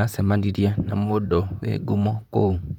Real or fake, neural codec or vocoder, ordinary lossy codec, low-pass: fake; vocoder, 44.1 kHz, 128 mel bands, Pupu-Vocoder; none; 19.8 kHz